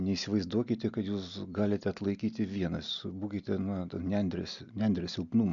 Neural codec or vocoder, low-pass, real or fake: none; 7.2 kHz; real